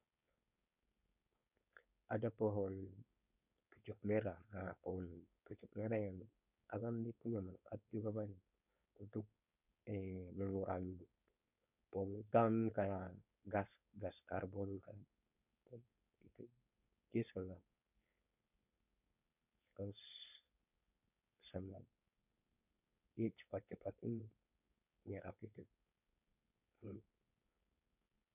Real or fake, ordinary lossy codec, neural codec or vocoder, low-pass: fake; none; codec, 16 kHz, 4.8 kbps, FACodec; 3.6 kHz